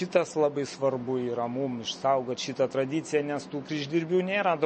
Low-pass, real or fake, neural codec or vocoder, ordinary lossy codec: 9.9 kHz; real; none; MP3, 32 kbps